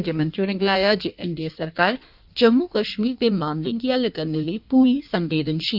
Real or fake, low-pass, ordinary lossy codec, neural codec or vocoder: fake; 5.4 kHz; none; codec, 16 kHz in and 24 kHz out, 1.1 kbps, FireRedTTS-2 codec